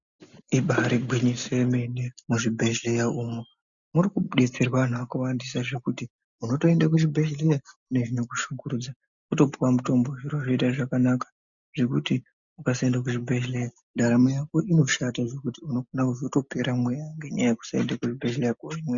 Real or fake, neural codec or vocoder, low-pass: real; none; 7.2 kHz